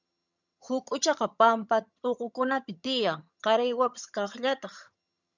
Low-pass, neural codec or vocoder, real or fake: 7.2 kHz; vocoder, 22.05 kHz, 80 mel bands, HiFi-GAN; fake